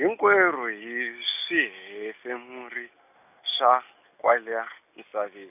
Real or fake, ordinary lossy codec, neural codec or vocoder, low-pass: real; none; none; 3.6 kHz